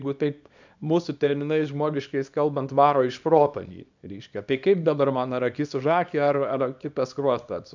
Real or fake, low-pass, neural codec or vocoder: fake; 7.2 kHz; codec, 24 kHz, 0.9 kbps, WavTokenizer, small release